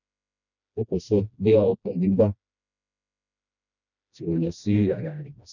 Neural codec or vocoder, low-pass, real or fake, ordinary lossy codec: codec, 16 kHz, 1 kbps, FreqCodec, smaller model; 7.2 kHz; fake; none